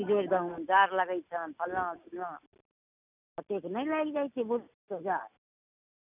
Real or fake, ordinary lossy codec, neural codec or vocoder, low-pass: real; none; none; 3.6 kHz